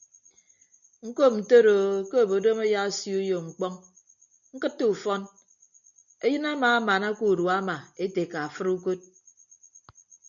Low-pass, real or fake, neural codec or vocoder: 7.2 kHz; real; none